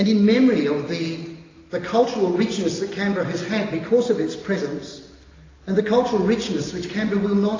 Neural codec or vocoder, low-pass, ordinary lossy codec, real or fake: none; 7.2 kHz; AAC, 32 kbps; real